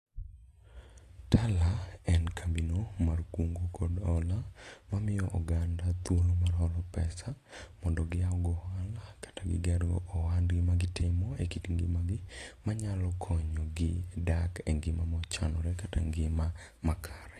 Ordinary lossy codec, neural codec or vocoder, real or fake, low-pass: AAC, 48 kbps; none; real; 14.4 kHz